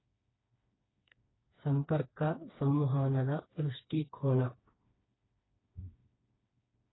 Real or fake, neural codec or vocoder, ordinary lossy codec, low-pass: fake; codec, 16 kHz, 2 kbps, FreqCodec, smaller model; AAC, 16 kbps; 7.2 kHz